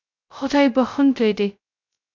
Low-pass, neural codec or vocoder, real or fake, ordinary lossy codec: 7.2 kHz; codec, 16 kHz, 0.2 kbps, FocalCodec; fake; MP3, 64 kbps